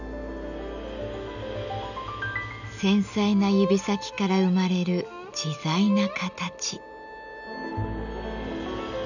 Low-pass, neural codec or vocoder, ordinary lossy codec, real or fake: 7.2 kHz; none; none; real